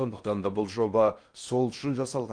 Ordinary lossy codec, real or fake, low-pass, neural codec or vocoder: Opus, 32 kbps; fake; 9.9 kHz; codec, 16 kHz in and 24 kHz out, 0.6 kbps, FocalCodec, streaming, 4096 codes